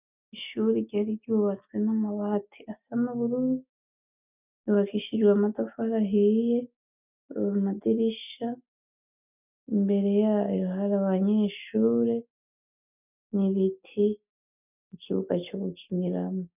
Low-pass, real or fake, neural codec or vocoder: 3.6 kHz; real; none